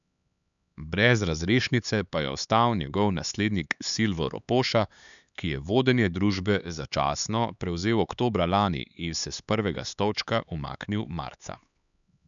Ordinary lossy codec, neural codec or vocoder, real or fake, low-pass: none; codec, 16 kHz, 4 kbps, X-Codec, HuBERT features, trained on LibriSpeech; fake; 7.2 kHz